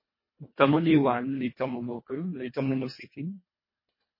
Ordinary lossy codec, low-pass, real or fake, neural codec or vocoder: MP3, 24 kbps; 5.4 kHz; fake; codec, 24 kHz, 1.5 kbps, HILCodec